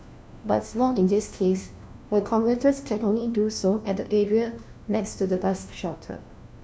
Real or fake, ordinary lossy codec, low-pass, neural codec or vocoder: fake; none; none; codec, 16 kHz, 1 kbps, FunCodec, trained on LibriTTS, 50 frames a second